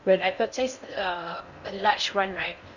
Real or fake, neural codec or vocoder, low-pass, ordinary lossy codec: fake; codec, 16 kHz in and 24 kHz out, 0.6 kbps, FocalCodec, streaming, 4096 codes; 7.2 kHz; none